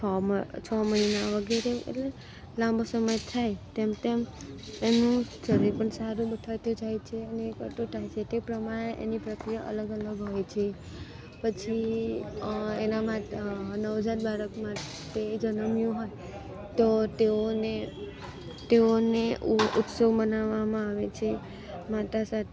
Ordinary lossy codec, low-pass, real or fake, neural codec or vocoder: none; none; real; none